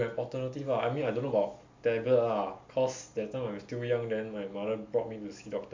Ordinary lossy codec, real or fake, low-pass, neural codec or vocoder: MP3, 48 kbps; real; 7.2 kHz; none